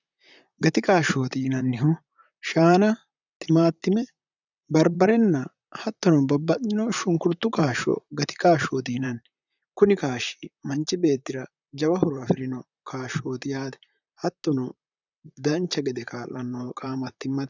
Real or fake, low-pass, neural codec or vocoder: fake; 7.2 kHz; vocoder, 44.1 kHz, 128 mel bands, Pupu-Vocoder